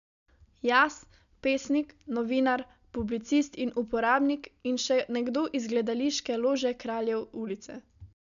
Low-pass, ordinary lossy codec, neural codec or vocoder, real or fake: 7.2 kHz; MP3, 96 kbps; none; real